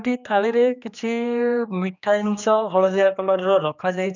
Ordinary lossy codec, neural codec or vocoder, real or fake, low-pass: none; codec, 16 kHz, 2 kbps, X-Codec, HuBERT features, trained on general audio; fake; 7.2 kHz